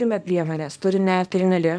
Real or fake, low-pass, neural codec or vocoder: fake; 9.9 kHz; codec, 24 kHz, 0.9 kbps, WavTokenizer, small release